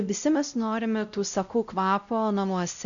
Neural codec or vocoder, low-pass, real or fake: codec, 16 kHz, 0.5 kbps, X-Codec, WavLM features, trained on Multilingual LibriSpeech; 7.2 kHz; fake